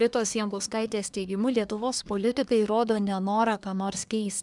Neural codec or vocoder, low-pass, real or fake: codec, 24 kHz, 1 kbps, SNAC; 10.8 kHz; fake